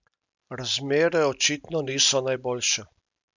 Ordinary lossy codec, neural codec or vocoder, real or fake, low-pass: none; none; real; 7.2 kHz